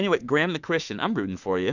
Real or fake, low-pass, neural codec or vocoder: fake; 7.2 kHz; autoencoder, 48 kHz, 32 numbers a frame, DAC-VAE, trained on Japanese speech